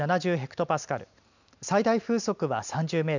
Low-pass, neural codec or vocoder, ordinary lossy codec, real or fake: 7.2 kHz; none; none; real